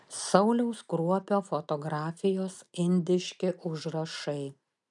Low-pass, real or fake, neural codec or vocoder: 10.8 kHz; real; none